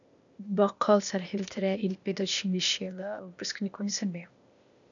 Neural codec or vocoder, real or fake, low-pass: codec, 16 kHz, 0.8 kbps, ZipCodec; fake; 7.2 kHz